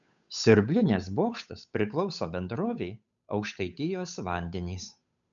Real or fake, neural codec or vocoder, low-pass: fake; codec, 16 kHz, 8 kbps, FunCodec, trained on Chinese and English, 25 frames a second; 7.2 kHz